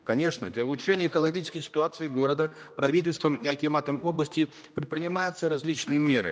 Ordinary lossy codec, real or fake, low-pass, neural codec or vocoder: none; fake; none; codec, 16 kHz, 1 kbps, X-Codec, HuBERT features, trained on general audio